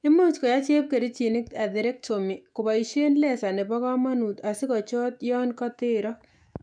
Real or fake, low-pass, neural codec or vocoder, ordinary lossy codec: fake; 9.9 kHz; autoencoder, 48 kHz, 128 numbers a frame, DAC-VAE, trained on Japanese speech; MP3, 96 kbps